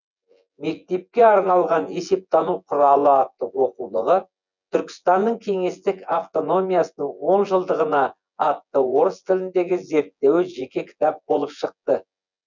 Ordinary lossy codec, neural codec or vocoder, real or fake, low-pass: none; vocoder, 24 kHz, 100 mel bands, Vocos; fake; 7.2 kHz